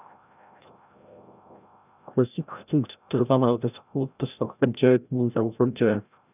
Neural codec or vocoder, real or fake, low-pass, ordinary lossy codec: codec, 16 kHz, 0.5 kbps, FreqCodec, larger model; fake; 3.6 kHz; none